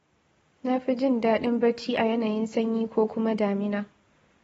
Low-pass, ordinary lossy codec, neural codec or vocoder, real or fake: 19.8 kHz; AAC, 24 kbps; none; real